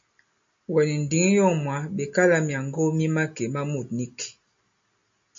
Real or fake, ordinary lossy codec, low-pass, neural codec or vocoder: real; MP3, 48 kbps; 7.2 kHz; none